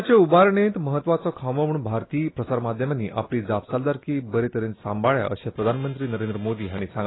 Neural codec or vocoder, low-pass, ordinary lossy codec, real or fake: none; 7.2 kHz; AAC, 16 kbps; real